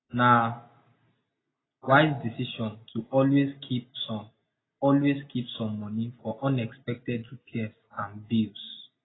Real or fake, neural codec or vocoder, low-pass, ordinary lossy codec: real; none; 7.2 kHz; AAC, 16 kbps